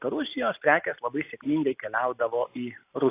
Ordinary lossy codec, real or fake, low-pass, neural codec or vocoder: AAC, 24 kbps; real; 3.6 kHz; none